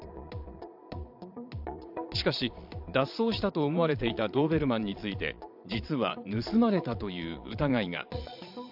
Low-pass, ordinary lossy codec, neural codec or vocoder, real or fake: 5.4 kHz; AAC, 48 kbps; vocoder, 22.05 kHz, 80 mel bands, Vocos; fake